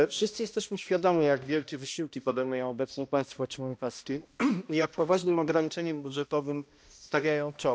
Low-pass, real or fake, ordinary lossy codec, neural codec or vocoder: none; fake; none; codec, 16 kHz, 1 kbps, X-Codec, HuBERT features, trained on balanced general audio